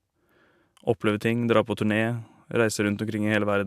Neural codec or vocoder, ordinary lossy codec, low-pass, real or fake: none; AAC, 96 kbps; 14.4 kHz; real